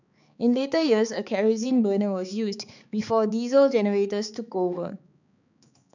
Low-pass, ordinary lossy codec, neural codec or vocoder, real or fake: 7.2 kHz; none; codec, 16 kHz, 4 kbps, X-Codec, HuBERT features, trained on balanced general audio; fake